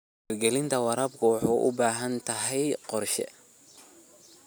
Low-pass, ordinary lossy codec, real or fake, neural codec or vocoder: none; none; real; none